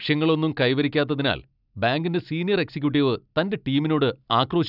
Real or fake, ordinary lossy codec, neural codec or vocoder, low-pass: real; none; none; 5.4 kHz